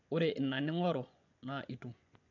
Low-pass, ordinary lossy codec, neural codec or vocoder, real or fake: 7.2 kHz; none; vocoder, 24 kHz, 100 mel bands, Vocos; fake